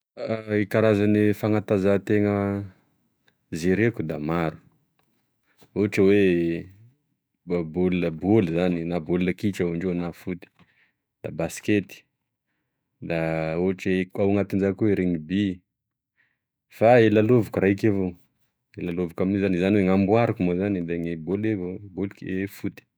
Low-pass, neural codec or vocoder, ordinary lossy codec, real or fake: none; autoencoder, 48 kHz, 128 numbers a frame, DAC-VAE, trained on Japanese speech; none; fake